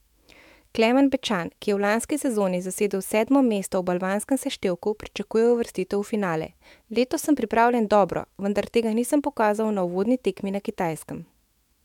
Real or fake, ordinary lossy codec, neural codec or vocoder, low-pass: fake; MP3, 96 kbps; autoencoder, 48 kHz, 128 numbers a frame, DAC-VAE, trained on Japanese speech; 19.8 kHz